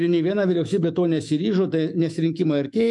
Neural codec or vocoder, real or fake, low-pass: autoencoder, 48 kHz, 128 numbers a frame, DAC-VAE, trained on Japanese speech; fake; 10.8 kHz